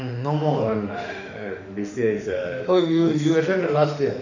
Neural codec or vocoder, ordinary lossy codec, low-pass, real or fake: autoencoder, 48 kHz, 32 numbers a frame, DAC-VAE, trained on Japanese speech; none; 7.2 kHz; fake